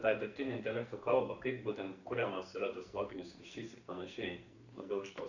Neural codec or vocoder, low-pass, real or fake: codec, 44.1 kHz, 2.6 kbps, SNAC; 7.2 kHz; fake